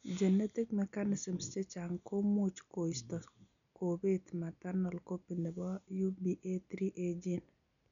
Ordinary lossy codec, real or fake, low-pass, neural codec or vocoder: none; real; 7.2 kHz; none